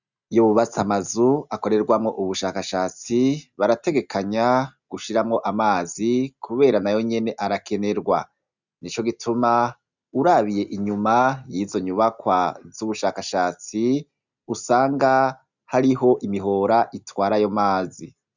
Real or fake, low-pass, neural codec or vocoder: real; 7.2 kHz; none